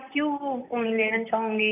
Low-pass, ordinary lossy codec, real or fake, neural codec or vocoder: 3.6 kHz; none; fake; vocoder, 44.1 kHz, 128 mel bands, Pupu-Vocoder